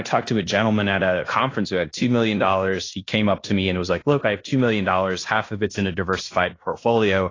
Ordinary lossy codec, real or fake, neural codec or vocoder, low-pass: AAC, 32 kbps; fake; codec, 16 kHz in and 24 kHz out, 0.9 kbps, LongCat-Audio-Codec, fine tuned four codebook decoder; 7.2 kHz